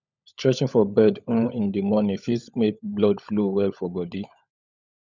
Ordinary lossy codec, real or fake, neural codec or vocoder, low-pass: none; fake; codec, 16 kHz, 16 kbps, FunCodec, trained on LibriTTS, 50 frames a second; 7.2 kHz